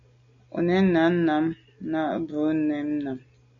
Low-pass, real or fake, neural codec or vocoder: 7.2 kHz; real; none